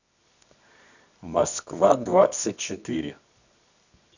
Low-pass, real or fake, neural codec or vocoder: 7.2 kHz; fake; codec, 24 kHz, 0.9 kbps, WavTokenizer, medium music audio release